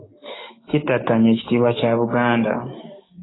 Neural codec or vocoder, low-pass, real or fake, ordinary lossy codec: codec, 16 kHz, 6 kbps, DAC; 7.2 kHz; fake; AAC, 16 kbps